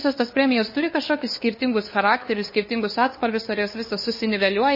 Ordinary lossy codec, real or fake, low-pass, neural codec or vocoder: MP3, 24 kbps; fake; 5.4 kHz; codec, 16 kHz, 2 kbps, FunCodec, trained on LibriTTS, 25 frames a second